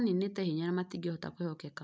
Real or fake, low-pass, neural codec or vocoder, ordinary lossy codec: real; none; none; none